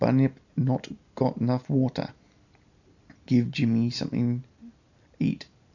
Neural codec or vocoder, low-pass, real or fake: none; 7.2 kHz; real